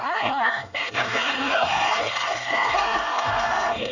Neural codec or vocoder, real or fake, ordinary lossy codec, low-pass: codec, 24 kHz, 1 kbps, SNAC; fake; none; 7.2 kHz